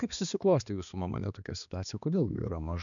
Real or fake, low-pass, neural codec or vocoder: fake; 7.2 kHz; codec, 16 kHz, 2 kbps, X-Codec, HuBERT features, trained on balanced general audio